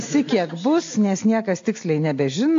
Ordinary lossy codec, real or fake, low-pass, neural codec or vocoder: AAC, 48 kbps; real; 7.2 kHz; none